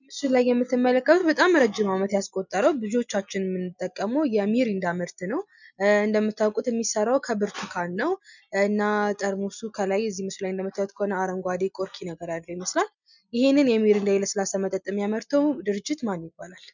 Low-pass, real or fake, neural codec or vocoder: 7.2 kHz; real; none